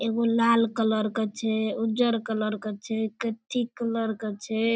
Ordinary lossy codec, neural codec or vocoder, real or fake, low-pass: none; none; real; none